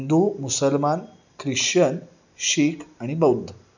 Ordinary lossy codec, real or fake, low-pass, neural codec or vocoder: none; real; 7.2 kHz; none